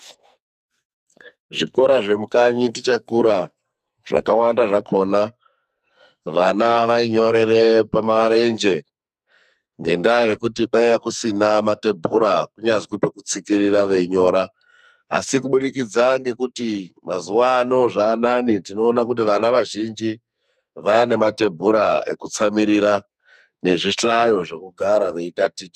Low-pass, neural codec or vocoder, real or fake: 14.4 kHz; codec, 44.1 kHz, 2.6 kbps, SNAC; fake